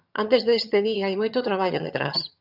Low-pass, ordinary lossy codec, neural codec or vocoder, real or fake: 5.4 kHz; Opus, 64 kbps; vocoder, 22.05 kHz, 80 mel bands, HiFi-GAN; fake